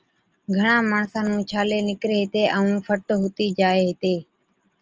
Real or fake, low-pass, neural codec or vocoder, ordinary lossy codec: real; 7.2 kHz; none; Opus, 24 kbps